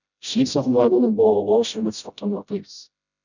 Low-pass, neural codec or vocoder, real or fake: 7.2 kHz; codec, 16 kHz, 0.5 kbps, FreqCodec, smaller model; fake